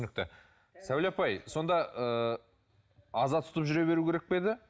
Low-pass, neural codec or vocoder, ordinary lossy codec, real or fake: none; none; none; real